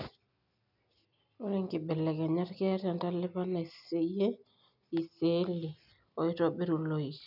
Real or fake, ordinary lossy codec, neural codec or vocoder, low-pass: real; none; none; 5.4 kHz